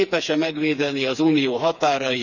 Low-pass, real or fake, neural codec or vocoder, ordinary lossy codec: 7.2 kHz; fake; codec, 16 kHz, 4 kbps, FreqCodec, smaller model; none